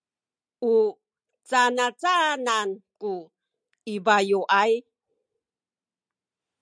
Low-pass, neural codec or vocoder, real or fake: 9.9 kHz; none; real